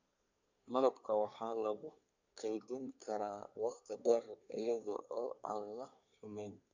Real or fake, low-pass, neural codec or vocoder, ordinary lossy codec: fake; 7.2 kHz; codec, 24 kHz, 1 kbps, SNAC; none